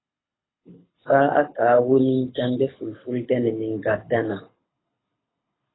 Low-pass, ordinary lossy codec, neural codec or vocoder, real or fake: 7.2 kHz; AAC, 16 kbps; codec, 24 kHz, 6 kbps, HILCodec; fake